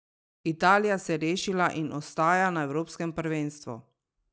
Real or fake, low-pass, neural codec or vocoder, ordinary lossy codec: real; none; none; none